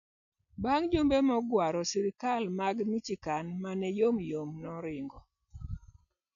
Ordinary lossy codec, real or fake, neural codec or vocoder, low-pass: none; real; none; 7.2 kHz